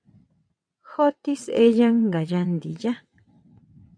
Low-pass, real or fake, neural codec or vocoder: 9.9 kHz; fake; vocoder, 22.05 kHz, 80 mel bands, WaveNeXt